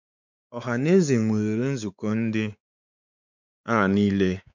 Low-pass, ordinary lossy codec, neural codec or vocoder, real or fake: 7.2 kHz; none; codec, 16 kHz, 4 kbps, X-Codec, WavLM features, trained on Multilingual LibriSpeech; fake